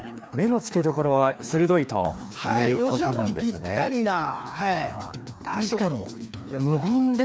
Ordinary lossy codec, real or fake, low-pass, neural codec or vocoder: none; fake; none; codec, 16 kHz, 2 kbps, FreqCodec, larger model